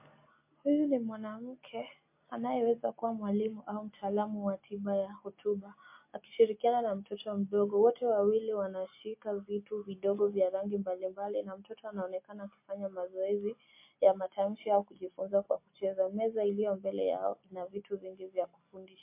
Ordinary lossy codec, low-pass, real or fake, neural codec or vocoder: MP3, 24 kbps; 3.6 kHz; real; none